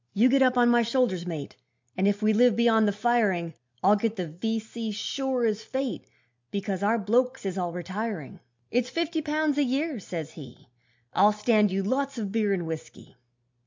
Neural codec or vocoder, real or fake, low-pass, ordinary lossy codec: none; real; 7.2 kHz; MP3, 64 kbps